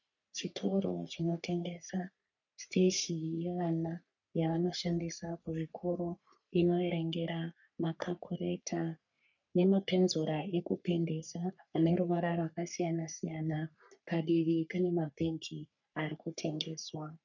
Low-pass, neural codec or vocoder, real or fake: 7.2 kHz; codec, 44.1 kHz, 3.4 kbps, Pupu-Codec; fake